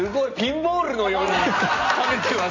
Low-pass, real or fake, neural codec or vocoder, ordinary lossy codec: 7.2 kHz; real; none; AAC, 32 kbps